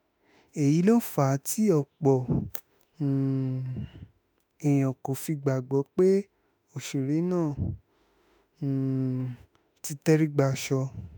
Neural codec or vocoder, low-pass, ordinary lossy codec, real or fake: autoencoder, 48 kHz, 32 numbers a frame, DAC-VAE, trained on Japanese speech; none; none; fake